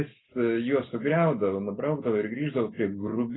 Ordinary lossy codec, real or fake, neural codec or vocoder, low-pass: AAC, 16 kbps; real; none; 7.2 kHz